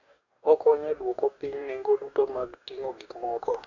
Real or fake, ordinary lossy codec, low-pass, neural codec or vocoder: fake; none; 7.2 kHz; codec, 44.1 kHz, 2.6 kbps, DAC